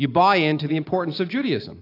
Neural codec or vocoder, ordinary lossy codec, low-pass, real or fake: none; AAC, 32 kbps; 5.4 kHz; real